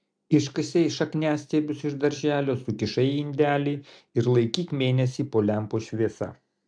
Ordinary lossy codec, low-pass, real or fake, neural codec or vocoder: AAC, 64 kbps; 9.9 kHz; real; none